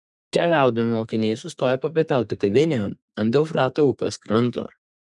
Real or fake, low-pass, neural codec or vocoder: fake; 10.8 kHz; codec, 32 kHz, 1.9 kbps, SNAC